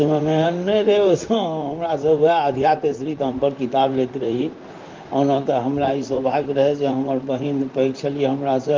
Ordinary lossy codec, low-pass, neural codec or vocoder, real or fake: Opus, 24 kbps; 7.2 kHz; vocoder, 44.1 kHz, 128 mel bands, Pupu-Vocoder; fake